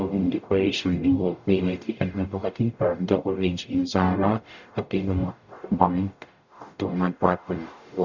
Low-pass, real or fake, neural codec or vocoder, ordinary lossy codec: 7.2 kHz; fake; codec, 44.1 kHz, 0.9 kbps, DAC; none